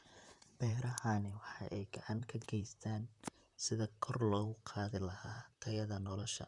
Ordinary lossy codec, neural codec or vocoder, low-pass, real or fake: none; vocoder, 44.1 kHz, 128 mel bands, Pupu-Vocoder; 10.8 kHz; fake